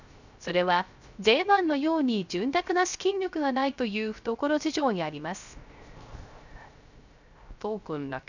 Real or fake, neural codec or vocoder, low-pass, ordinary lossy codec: fake; codec, 16 kHz, 0.3 kbps, FocalCodec; 7.2 kHz; none